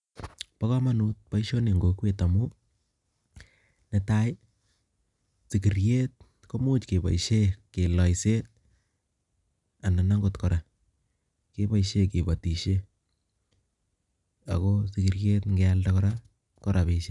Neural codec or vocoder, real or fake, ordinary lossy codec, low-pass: none; real; none; 10.8 kHz